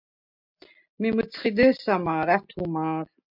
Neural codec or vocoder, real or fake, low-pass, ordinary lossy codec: none; real; 5.4 kHz; MP3, 48 kbps